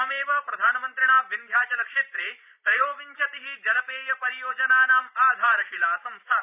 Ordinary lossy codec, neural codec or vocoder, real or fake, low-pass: none; vocoder, 44.1 kHz, 128 mel bands every 512 samples, BigVGAN v2; fake; 3.6 kHz